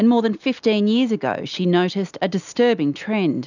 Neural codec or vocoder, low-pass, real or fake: none; 7.2 kHz; real